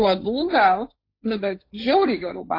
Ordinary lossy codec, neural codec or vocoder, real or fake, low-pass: AAC, 32 kbps; codec, 16 kHz, 1.1 kbps, Voila-Tokenizer; fake; 5.4 kHz